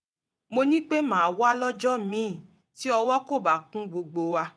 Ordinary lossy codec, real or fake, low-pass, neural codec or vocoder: none; fake; none; vocoder, 22.05 kHz, 80 mel bands, WaveNeXt